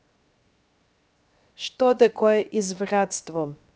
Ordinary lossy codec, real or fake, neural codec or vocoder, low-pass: none; fake; codec, 16 kHz, 0.7 kbps, FocalCodec; none